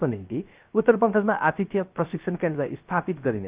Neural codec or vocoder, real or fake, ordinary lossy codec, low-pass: codec, 16 kHz, 0.3 kbps, FocalCodec; fake; Opus, 32 kbps; 3.6 kHz